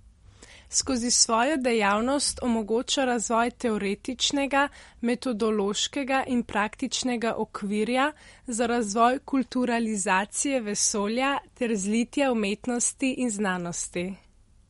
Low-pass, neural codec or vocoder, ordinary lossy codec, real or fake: 19.8 kHz; none; MP3, 48 kbps; real